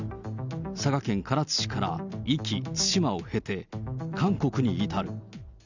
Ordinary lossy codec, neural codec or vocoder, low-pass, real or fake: none; none; 7.2 kHz; real